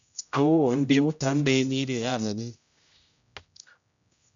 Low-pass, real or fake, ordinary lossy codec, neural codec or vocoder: 7.2 kHz; fake; MP3, 64 kbps; codec, 16 kHz, 0.5 kbps, X-Codec, HuBERT features, trained on general audio